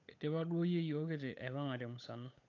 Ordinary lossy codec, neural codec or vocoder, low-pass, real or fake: AAC, 48 kbps; codec, 16 kHz, 8 kbps, FunCodec, trained on Chinese and English, 25 frames a second; 7.2 kHz; fake